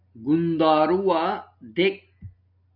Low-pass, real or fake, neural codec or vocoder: 5.4 kHz; real; none